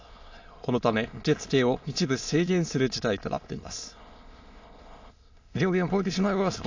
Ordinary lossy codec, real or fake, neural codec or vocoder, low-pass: AAC, 48 kbps; fake; autoencoder, 22.05 kHz, a latent of 192 numbers a frame, VITS, trained on many speakers; 7.2 kHz